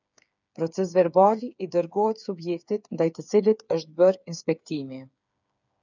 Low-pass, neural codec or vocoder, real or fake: 7.2 kHz; codec, 16 kHz, 8 kbps, FreqCodec, smaller model; fake